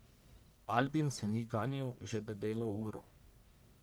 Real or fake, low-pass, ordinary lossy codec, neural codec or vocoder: fake; none; none; codec, 44.1 kHz, 1.7 kbps, Pupu-Codec